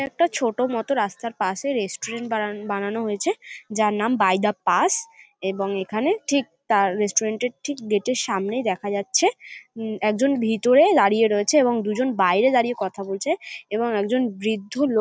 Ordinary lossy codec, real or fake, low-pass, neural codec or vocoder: none; real; none; none